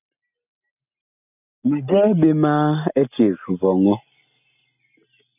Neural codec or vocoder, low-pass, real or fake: none; 3.6 kHz; real